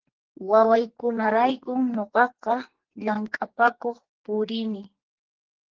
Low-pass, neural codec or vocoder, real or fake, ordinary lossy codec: 7.2 kHz; codec, 44.1 kHz, 2.6 kbps, SNAC; fake; Opus, 16 kbps